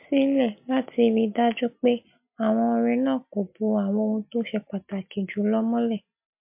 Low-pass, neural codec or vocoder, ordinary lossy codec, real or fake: 3.6 kHz; none; MP3, 32 kbps; real